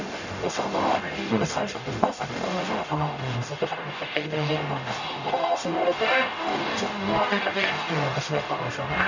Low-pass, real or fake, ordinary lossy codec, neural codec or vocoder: 7.2 kHz; fake; none; codec, 44.1 kHz, 0.9 kbps, DAC